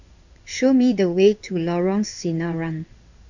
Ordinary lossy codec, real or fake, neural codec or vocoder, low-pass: none; fake; codec, 16 kHz in and 24 kHz out, 1 kbps, XY-Tokenizer; 7.2 kHz